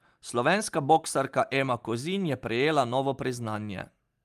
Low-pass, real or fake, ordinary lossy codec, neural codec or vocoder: 14.4 kHz; real; Opus, 32 kbps; none